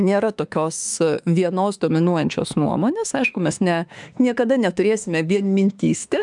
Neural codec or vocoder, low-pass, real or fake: autoencoder, 48 kHz, 32 numbers a frame, DAC-VAE, trained on Japanese speech; 10.8 kHz; fake